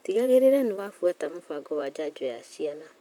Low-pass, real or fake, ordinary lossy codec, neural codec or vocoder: 19.8 kHz; fake; none; vocoder, 44.1 kHz, 128 mel bands, Pupu-Vocoder